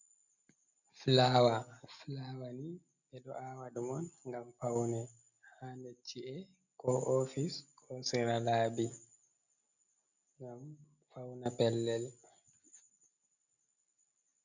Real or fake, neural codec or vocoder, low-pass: real; none; 7.2 kHz